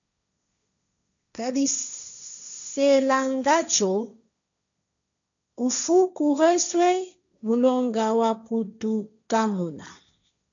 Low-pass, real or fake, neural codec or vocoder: 7.2 kHz; fake; codec, 16 kHz, 1.1 kbps, Voila-Tokenizer